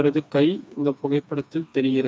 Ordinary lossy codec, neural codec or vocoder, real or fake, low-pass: none; codec, 16 kHz, 2 kbps, FreqCodec, smaller model; fake; none